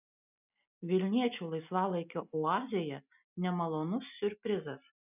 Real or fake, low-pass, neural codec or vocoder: real; 3.6 kHz; none